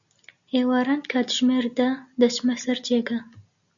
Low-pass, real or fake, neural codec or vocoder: 7.2 kHz; real; none